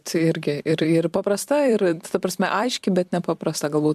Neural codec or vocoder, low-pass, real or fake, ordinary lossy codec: vocoder, 44.1 kHz, 128 mel bands every 256 samples, BigVGAN v2; 14.4 kHz; fake; MP3, 96 kbps